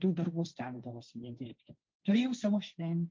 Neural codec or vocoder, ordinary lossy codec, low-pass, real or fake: codec, 16 kHz, 1.1 kbps, Voila-Tokenizer; Opus, 24 kbps; 7.2 kHz; fake